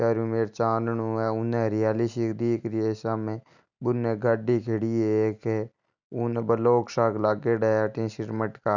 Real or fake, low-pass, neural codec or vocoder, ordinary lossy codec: real; 7.2 kHz; none; none